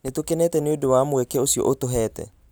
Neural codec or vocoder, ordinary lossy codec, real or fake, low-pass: none; none; real; none